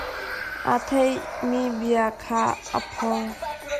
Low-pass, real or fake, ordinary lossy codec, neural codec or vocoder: 14.4 kHz; real; Opus, 64 kbps; none